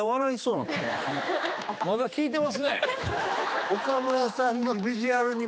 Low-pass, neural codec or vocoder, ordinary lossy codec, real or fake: none; codec, 16 kHz, 2 kbps, X-Codec, HuBERT features, trained on general audio; none; fake